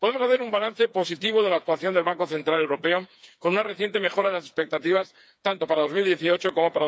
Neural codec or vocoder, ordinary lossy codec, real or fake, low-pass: codec, 16 kHz, 4 kbps, FreqCodec, smaller model; none; fake; none